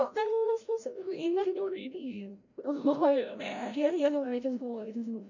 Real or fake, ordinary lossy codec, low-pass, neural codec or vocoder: fake; none; 7.2 kHz; codec, 16 kHz, 0.5 kbps, FreqCodec, larger model